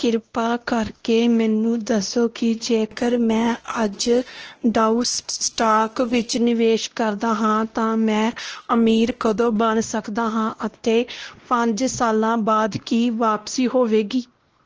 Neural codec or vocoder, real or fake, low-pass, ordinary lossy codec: codec, 16 kHz, 1 kbps, X-Codec, WavLM features, trained on Multilingual LibriSpeech; fake; 7.2 kHz; Opus, 16 kbps